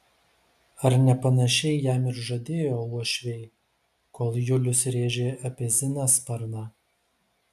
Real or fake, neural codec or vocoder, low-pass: real; none; 14.4 kHz